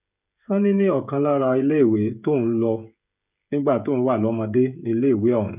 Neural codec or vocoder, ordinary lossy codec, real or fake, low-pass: codec, 16 kHz, 16 kbps, FreqCodec, smaller model; none; fake; 3.6 kHz